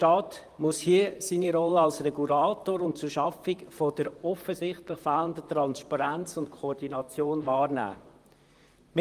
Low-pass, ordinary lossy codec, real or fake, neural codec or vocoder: 14.4 kHz; Opus, 32 kbps; fake; vocoder, 44.1 kHz, 128 mel bands, Pupu-Vocoder